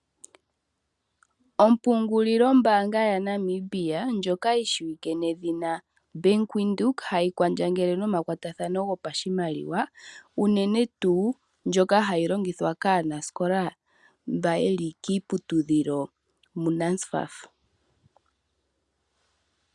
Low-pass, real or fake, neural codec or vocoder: 10.8 kHz; real; none